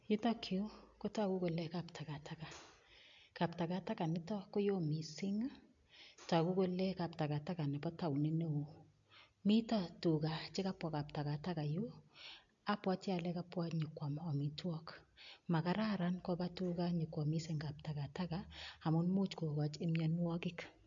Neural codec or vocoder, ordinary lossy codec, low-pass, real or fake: none; AAC, 64 kbps; 7.2 kHz; real